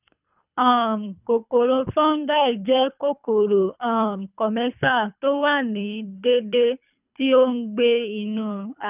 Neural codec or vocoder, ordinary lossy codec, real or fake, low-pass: codec, 24 kHz, 3 kbps, HILCodec; none; fake; 3.6 kHz